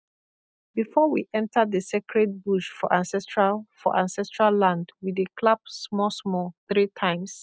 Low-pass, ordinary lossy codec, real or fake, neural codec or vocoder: none; none; real; none